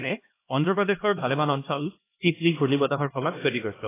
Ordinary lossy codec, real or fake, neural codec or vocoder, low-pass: AAC, 16 kbps; fake; codec, 16 kHz, 1 kbps, X-Codec, HuBERT features, trained on LibriSpeech; 3.6 kHz